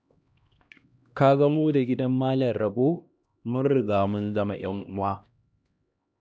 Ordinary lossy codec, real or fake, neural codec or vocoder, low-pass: none; fake; codec, 16 kHz, 1 kbps, X-Codec, HuBERT features, trained on LibriSpeech; none